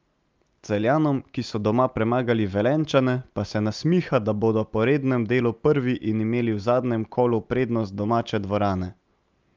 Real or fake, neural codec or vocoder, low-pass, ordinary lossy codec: real; none; 7.2 kHz; Opus, 24 kbps